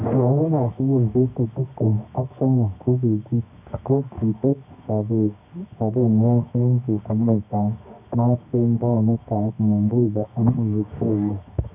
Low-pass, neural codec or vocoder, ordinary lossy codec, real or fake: 3.6 kHz; codec, 24 kHz, 0.9 kbps, WavTokenizer, medium music audio release; none; fake